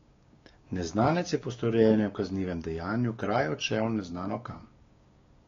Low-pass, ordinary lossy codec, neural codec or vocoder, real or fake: 7.2 kHz; AAC, 32 kbps; codec, 16 kHz, 6 kbps, DAC; fake